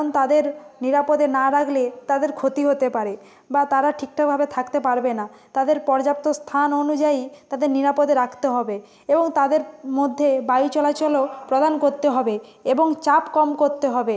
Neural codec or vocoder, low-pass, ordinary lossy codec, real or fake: none; none; none; real